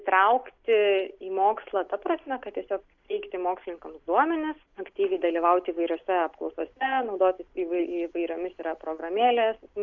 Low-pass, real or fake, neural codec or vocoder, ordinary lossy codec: 7.2 kHz; real; none; MP3, 64 kbps